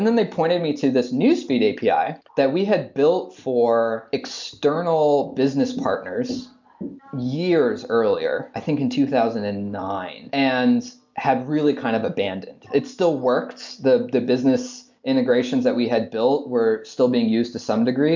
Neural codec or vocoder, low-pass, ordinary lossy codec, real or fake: none; 7.2 kHz; MP3, 64 kbps; real